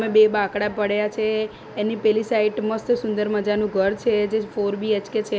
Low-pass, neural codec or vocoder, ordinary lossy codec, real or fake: none; none; none; real